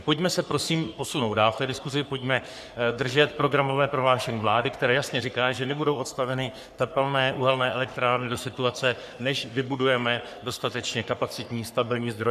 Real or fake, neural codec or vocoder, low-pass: fake; codec, 44.1 kHz, 3.4 kbps, Pupu-Codec; 14.4 kHz